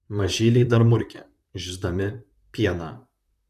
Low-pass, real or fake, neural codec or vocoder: 14.4 kHz; fake; vocoder, 44.1 kHz, 128 mel bands, Pupu-Vocoder